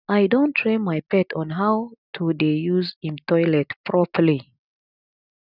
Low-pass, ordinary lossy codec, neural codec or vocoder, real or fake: 5.4 kHz; none; none; real